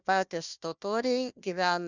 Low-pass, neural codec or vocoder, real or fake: 7.2 kHz; codec, 16 kHz, 1 kbps, FunCodec, trained on Chinese and English, 50 frames a second; fake